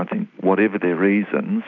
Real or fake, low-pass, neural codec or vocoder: real; 7.2 kHz; none